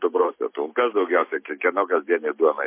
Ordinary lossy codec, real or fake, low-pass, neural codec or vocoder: MP3, 24 kbps; real; 3.6 kHz; none